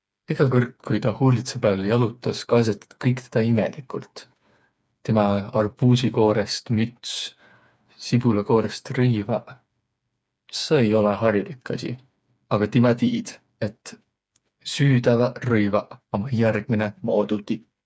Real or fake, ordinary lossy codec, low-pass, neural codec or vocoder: fake; none; none; codec, 16 kHz, 4 kbps, FreqCodec, smaller model